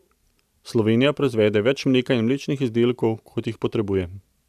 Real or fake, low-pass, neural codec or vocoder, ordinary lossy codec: real; 14.4 kHz; none; none